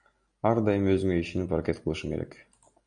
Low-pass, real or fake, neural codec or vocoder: 9.9 kHz; real; none